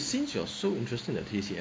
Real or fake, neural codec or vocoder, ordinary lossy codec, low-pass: real; none; none; none